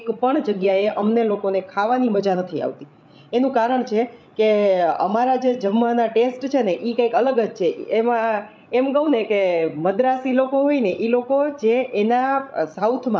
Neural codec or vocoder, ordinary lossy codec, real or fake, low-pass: codec, 16 kHz, 16 kbps, FreqCodec, larger model; none; fake; none